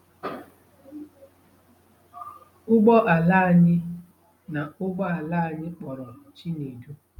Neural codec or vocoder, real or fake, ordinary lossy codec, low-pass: none; real; none; 19.8 kHz